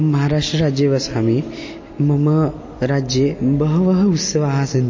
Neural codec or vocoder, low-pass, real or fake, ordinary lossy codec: none; 7.2 kHz; real; MP3, 32 kbps